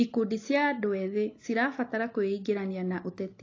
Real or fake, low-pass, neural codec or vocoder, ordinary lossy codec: real; 7.2 kHz; none; AAC, 32 kbps